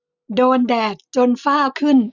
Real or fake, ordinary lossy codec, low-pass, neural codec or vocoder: real; none; 7.2 kHz; none